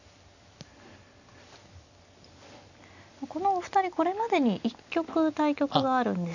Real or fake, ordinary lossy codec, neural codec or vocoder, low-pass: real; none; none; 7.2 kHz